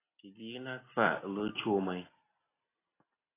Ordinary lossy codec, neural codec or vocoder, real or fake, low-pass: AAC, 16 kbps; none; real; 3.6 kHz